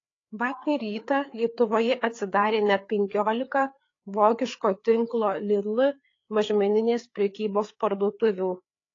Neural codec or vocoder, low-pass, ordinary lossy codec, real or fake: codec, 16 kHz, 4 kbps, FreqCodec, larger model; 7.2 kHz; AAC, 32 kbps; fake